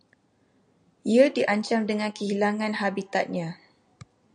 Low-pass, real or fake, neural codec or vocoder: 9.9 kHz; real; none